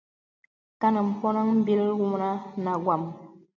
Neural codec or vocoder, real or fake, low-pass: none; real; 7.2 kHz